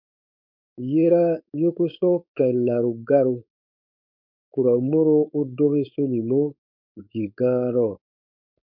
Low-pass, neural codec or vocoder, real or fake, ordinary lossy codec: 5.4 kHz; codec, 16 kHz, 4.8 kbps, FACodec; fake; MP3, 48 kbps